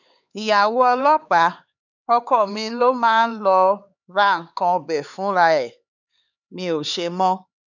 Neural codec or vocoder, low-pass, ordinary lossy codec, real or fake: codec, 16 kHz, 4 kbps, X-Codec, HuBERT features, trained on LibriSpeech; 7.2 kHz; none; fake